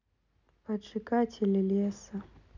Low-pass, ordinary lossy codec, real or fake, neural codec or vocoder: 7.2 kHz; none; real; none